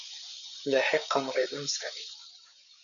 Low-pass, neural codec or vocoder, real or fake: 7.2 kHz; codec, 16 kHz, 4 kbps, FreqCodec, larger model; fake